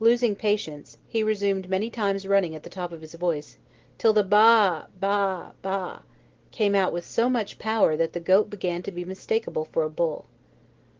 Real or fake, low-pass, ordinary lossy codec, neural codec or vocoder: real; 7.2 kHz; Opus, 16 kbps; none